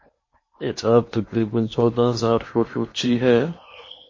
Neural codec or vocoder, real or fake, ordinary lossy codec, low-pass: codec, 16 kHz in and 24 kHz out, 0.8 kbps, FocalCodec, streaming, 65536 codes; fake; MP3, 32 kbps; 7.2 kHz